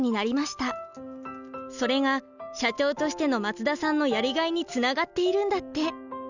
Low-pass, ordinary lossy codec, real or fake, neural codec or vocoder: 7.2 kHz; none; real; none